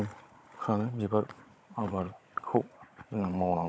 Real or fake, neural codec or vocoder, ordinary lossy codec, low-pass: fake; codec, 16 kHz, 16 kbps, FunCodec, trained on Chinese and English, 50 frames a second; none; none